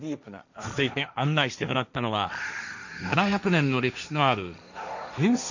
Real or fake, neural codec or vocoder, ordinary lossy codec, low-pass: fake; codec, 16 kHz, 1.1 kbps, Voila-Tokenizer; none; 7.2 kHz